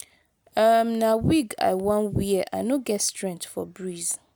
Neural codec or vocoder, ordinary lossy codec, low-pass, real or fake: none; none; none; real